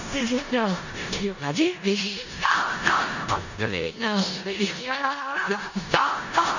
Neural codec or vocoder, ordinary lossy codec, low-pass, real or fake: codec, 16 kHz in and 24 kHz out, 0.4 kbps, LongCat-Audio-Codec, four codebook decoder; none; 7.2 kHz; fake